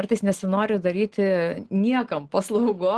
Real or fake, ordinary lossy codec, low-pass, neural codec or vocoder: real; Opus, 16 kbps; 10.8 kHz; none